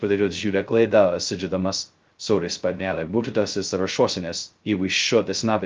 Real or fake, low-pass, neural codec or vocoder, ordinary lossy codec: fake; 7.2 kHz; codec, 16 kHz, 0.2 kbps, FocalCodec; Opus, 24 kbps